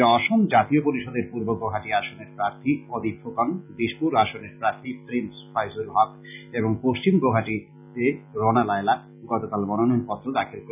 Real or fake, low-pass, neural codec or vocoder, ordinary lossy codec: real; 3.6 kHz; none; none